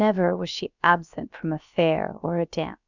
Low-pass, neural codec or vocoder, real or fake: 7.2 kHz; codec, 16 kHz, about 1 kbps, DyCAST, with the encoder's durations; fake